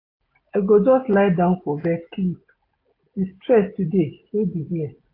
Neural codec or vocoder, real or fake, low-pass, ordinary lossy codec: none; real; 5.4 kHz; AAC, 32 kbps